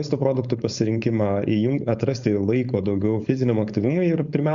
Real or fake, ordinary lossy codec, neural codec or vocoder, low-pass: fake; Opus, 64 kbps; codec, 16 kHz, 4.8 kbps, FACodec; 7.2 kHz